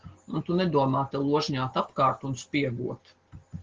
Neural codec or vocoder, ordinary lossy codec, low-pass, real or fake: none; Opus, 16 kbps; 7.2 kHz; real